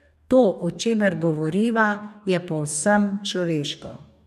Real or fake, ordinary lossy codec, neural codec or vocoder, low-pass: fake; none; codec, 44.1 kHz, 2.6 kbps, DAC; 14.4 kHz